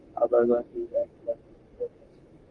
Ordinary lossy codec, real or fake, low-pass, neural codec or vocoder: Opus, 24 kbps; fake; 9.9 kHz; codec, 44.1 kHz, 7.8 kbps, Pupu-Codec